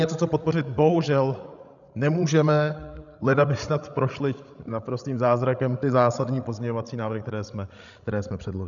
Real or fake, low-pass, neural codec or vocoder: fake; 7.2 kHz; codec, 16 kHz, 16 kbps, FreqCodec, larger model